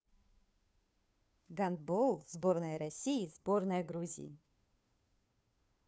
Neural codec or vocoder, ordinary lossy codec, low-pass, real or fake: codec, 16 kHz, 2 kbps, FunCodec, trained on Chinese and English, 25 frames a second; none; none; fake